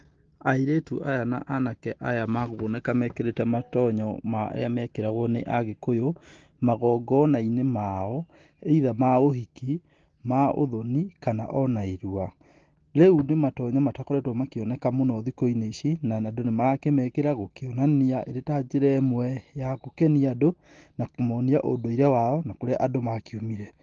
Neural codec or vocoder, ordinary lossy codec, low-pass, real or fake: none; Opus, 16 kbps; 7.2 kHz; real